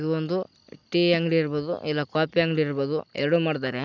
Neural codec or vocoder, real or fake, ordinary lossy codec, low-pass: none; real; none; 7.2 kHz